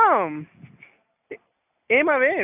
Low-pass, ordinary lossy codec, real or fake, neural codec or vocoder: 3.6 kHz; none; real; none